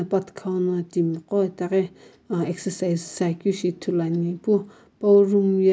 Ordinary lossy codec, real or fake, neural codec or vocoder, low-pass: none; real; none; none